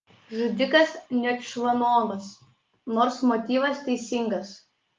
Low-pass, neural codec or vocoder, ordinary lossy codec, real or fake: 7.2 kHz; none; Opus, 32 kbps; real